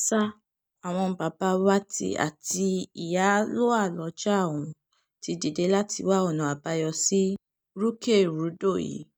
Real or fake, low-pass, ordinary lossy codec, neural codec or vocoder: real; none; none; none